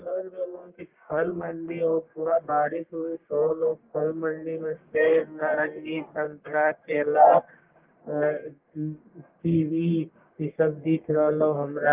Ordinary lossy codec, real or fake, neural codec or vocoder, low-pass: Opus, 32 kbps; fake; codec, 44.1 kHz, 1.7 kbps, Pupu-Codec; 3.6 kHz